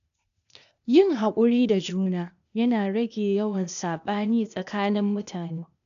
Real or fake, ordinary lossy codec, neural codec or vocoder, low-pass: fake; none; codec, 16 kHz, 0.8 kbps, ZipCodec; 7.2 kHz